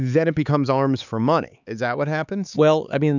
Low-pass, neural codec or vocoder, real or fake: 7.2 kHz; codec, 16 kHz, 4 kbps, X-Codec, HuBERT features, trained on LibriSpeech; fake